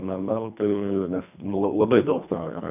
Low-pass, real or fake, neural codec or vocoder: 3.6 kHz; fake; codec, 24 kHz, 1.5 kbps, HILCodec